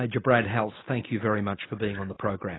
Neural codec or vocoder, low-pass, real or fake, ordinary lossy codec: none; 7.2 kHz; real; AAC, 16 kbps